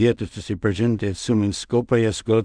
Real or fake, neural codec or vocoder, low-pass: fake; codec, 16 kHz in and 24 kHz out, 0.4 kbps, LongCat-Audio-Codec, two codebook decoder; 9.9 kHz